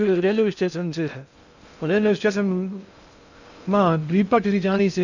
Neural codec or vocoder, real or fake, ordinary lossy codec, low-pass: codec, 16 kHz in and 24 kHz out, 0.6 kbps, FocalCodec, streaming, 2048 codes; fake; none; 7.2 kHz